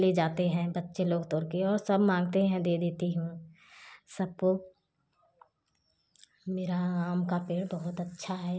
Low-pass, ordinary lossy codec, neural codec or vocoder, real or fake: none; none; none; real